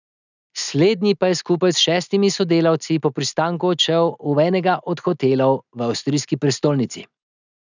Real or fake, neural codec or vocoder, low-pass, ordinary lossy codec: real; none; 7.2 kHz; none